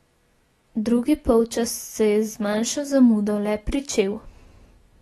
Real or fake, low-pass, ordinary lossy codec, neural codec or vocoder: fake; 19.8 kHz; AAC, 32 kbps; autoencoder, 48 kHz, 128 numbers a frame, DAC-VAE, trained on Japanese speech